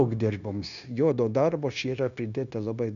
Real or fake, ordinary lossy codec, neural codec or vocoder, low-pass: fake; AAC, 96 kbps; codec, 16 kHz, 0.9 kbps, LongCat-Audio-Codec; 7.2 kHz